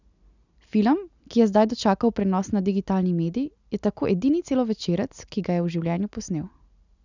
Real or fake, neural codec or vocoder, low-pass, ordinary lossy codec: real; none; 7.2 kHz; none